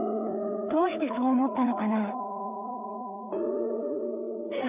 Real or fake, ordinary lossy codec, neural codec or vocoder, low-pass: fake; none; codec, 16 kHz, 4 kbps, FreqCodec, larger model; 3.6 kHz